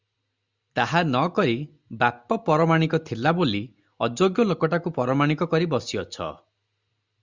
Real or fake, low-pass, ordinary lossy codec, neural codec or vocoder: real; 7.2 kHz; Opus, 64 kbps; none